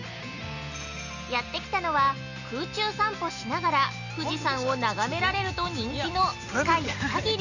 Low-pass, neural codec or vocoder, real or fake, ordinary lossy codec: 7.2 kHz; none; real; none